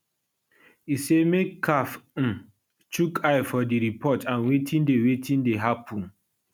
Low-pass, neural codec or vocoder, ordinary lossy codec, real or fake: none; none; none; real